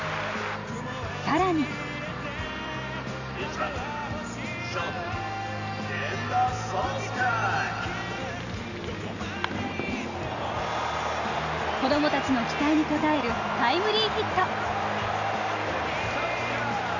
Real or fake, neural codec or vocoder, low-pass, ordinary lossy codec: real; none; 7.2 kHz; none